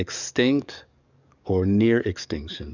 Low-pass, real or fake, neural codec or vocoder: 7.2 kHz; fake; codec, 44.1 kHz, 7.8 kbps, DAC